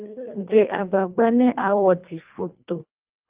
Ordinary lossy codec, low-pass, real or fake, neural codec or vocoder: Opus, 24 kbps; 3.6 kHz; fake; codec, 24 kHz, 1.5 kbps, HILCodec